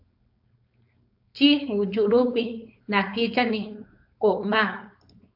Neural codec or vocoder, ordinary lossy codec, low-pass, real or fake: codec, 16 kHz, 4.8 kbps, FACodec; AAC, 48 kbps; 5.4 kHz; fake